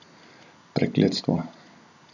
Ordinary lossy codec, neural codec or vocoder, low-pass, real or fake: none; none; 7.2 kHz; real